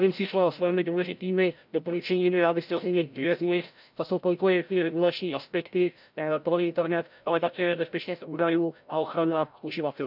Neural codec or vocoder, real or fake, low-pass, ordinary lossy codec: codec, 16 kHz, 0.5 kbps, FreqCodec, larger model; fake; 5.4 kHz; none